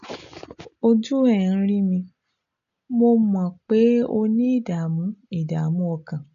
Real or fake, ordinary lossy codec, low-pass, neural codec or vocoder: real; none; 7.2 kHz; none